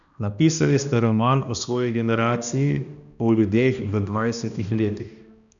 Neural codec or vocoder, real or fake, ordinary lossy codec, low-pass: codec, 16 kHz, 1 kbps, X-Codec, HuBERT features, trained on balanced general audio; fake; none; 7.2 kHz